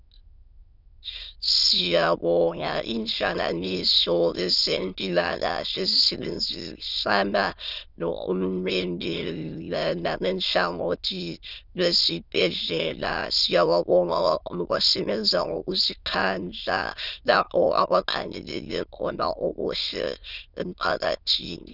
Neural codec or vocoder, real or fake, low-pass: autoencoder, 22.05 kHz, a latent of 192 numbers a frame, VITS, trained on many speakers; fake; 5.4 kHz